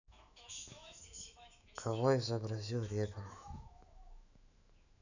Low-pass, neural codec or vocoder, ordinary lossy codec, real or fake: 7.2 kHz; autoencoder, 48 kHz, 128 numbers a frame, DAC-VAE, trained on Japanese speech; none; fake